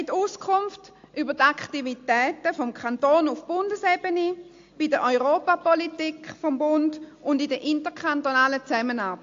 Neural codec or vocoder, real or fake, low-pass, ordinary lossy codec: none; real; 7.2 kHz; AAC, 48 kbps